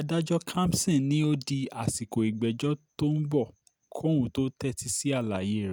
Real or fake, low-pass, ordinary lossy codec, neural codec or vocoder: real; none; none; none